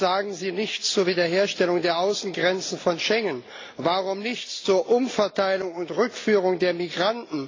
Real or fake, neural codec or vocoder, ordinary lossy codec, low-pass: real; none; AAC, 32 kbps; 7.2 kHz